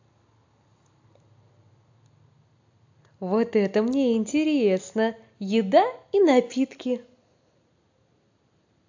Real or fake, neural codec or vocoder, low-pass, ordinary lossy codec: real; none; 7.2 kHz; AAC, 48 kbps